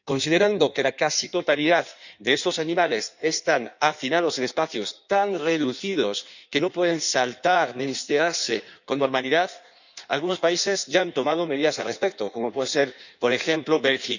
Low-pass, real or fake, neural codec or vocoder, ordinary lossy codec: 7.2 kHz; fake; codec, 16 kHz in and 24 kHz out, 1.1 kbps, FireRedTTS-2 codec; none